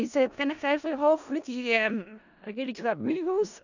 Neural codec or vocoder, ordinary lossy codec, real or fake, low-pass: codec, 16 kHz in and 24 kHz out, 0.4 kbps, LongCat-Audio-Codec, four codebook decoder; none; fake; 7.2 kHz